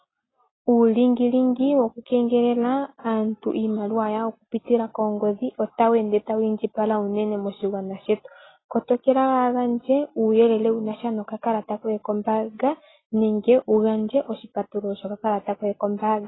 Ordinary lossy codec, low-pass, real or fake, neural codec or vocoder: AAC, 16 kbps; 7.2 kHz; real; none